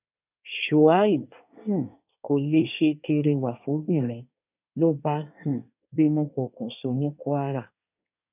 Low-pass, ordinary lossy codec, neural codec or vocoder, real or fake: 3.6 kHz; none; codec, 24 kHz, 1 kbps, SNAC; fake